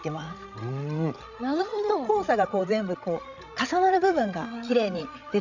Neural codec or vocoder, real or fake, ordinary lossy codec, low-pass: codec, 16 kHz, 16 kbps, FreqCodec, larger model; fake; none; 7.2 kHz